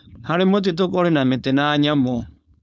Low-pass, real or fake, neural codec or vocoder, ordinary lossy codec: none; fake; codec, 16 kHz, 4.8 kbps, FACodec; none